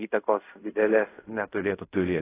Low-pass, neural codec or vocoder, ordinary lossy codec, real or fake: 3.6 kHz; codec, 16 kHz in and 24 kHz out, 0.4 kbps, LongCat-Audio-Codec, fine tuned four codebook decoder; AAC, 16 kbps; fake